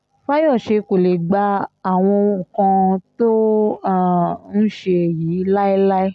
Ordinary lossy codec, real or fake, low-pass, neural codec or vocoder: none; real; none; none